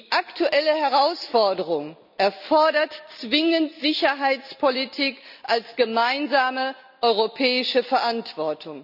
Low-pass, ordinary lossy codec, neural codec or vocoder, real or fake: 5.4 kHz; none; none; real